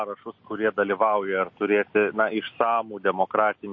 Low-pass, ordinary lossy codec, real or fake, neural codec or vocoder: 7.2 kHz; MP3, 32 kbps; real; none